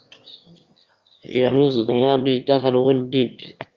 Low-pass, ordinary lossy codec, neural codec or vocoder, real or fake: 7.2 kHz; Opus, 32 kbps; autoencoder, 22.05 kHz, a latent of 192 numbers a frame, VITS, trained on one speaker; fake